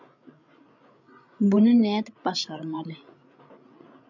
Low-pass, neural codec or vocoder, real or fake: 7.2 kHz; codec, 16 kHz, 8 kbps, FreqCodec, larger model; fake